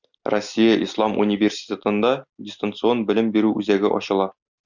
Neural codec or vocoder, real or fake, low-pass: none; real; 7.2 kHz